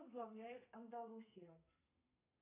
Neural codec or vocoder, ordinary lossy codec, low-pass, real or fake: codec, 32 kHz, 1.9 kbps, SNAC; AAC, 24 kbps; 3.6 kHz; fake